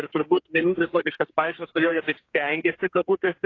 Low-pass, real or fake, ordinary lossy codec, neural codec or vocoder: 7.2 kHz; fake; AAC, 32 kbps; codec, 32 kHz, 1.9 kbps, SNAC